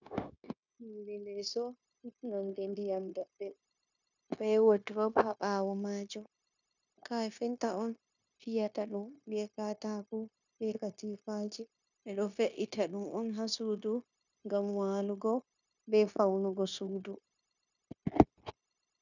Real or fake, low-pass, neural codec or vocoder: fake; 7.2 kHz; codec, 16 kHz, 0.9 kbps, LongCat-Audio-Codec